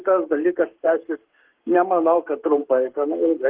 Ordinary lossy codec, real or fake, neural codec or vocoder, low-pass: Opus, 16 kbps; fake; codec, 44.1 kHz, 7.8 kbps, Pupu-Codec; 3.6 kHz